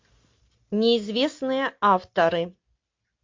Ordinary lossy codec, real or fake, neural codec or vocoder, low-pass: MP3, 48 kbps; real; none; 7.2 kHz